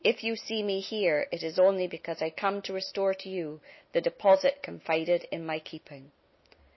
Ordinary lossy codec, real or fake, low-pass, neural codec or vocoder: MP3, 24 kbps; real; 7.2 kHz; none